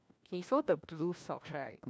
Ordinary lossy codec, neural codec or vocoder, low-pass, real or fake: none; codec, 16 kHz, 1 kbps, FunCodec, trained on LibriTTS, 50 frames a second; none; fake